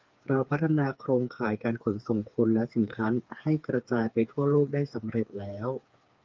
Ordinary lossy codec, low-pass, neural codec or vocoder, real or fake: Opus, 32 kbps; 7.2 kHz; codec, 16 kHz, 4 kbps, FreqCodec, smaller model; fake